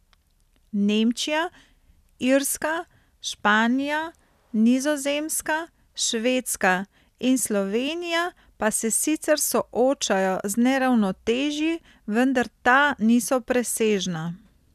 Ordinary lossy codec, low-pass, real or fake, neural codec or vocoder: none; 14.4 kHz; real; none